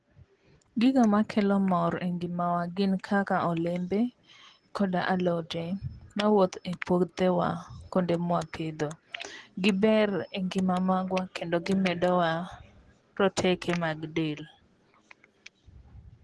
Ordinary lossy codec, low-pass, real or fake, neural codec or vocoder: Opus, 16 kbps; 10.8 kHz; real; none